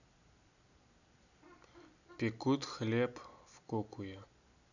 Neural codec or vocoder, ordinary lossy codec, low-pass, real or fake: none; none; 7.2 kHz; real